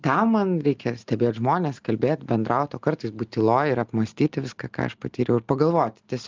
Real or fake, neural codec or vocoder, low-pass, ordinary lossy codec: real; none; 7.2 kHz; Opus, 24 kbps